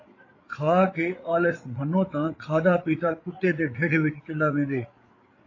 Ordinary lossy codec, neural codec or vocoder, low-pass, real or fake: AAC, 32 kbps; codec, 16 kHz, 8 kbps, FreqCodec, larger model; 7.2 kHz; fake